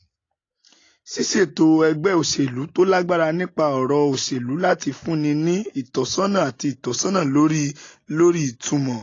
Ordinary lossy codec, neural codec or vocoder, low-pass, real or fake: AAC, 32 kbps; none; 7.2 kHz; real